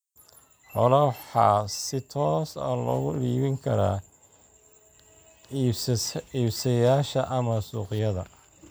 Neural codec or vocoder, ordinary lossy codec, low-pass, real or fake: none; none; none; real